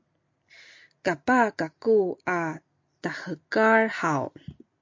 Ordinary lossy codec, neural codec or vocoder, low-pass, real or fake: MP3, 48 kbps; none; 7.2 kHz; real